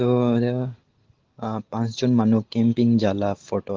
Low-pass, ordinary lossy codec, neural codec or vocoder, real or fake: 7.2 kHz; Opus, 16 kbps; none; real